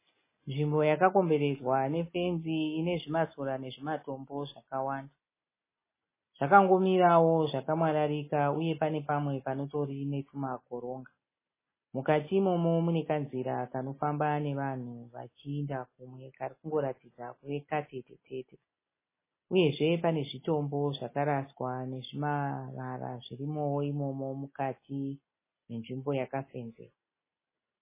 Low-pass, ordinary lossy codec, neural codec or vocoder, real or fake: 3.6 kHz; MP3, 16 kbps; none; real